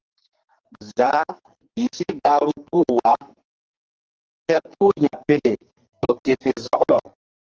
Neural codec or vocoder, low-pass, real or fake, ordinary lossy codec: codec, 44.1 kHz, 2.6 kbps, SNAC; 7.2 kHz; fake; Opus, 16 kbps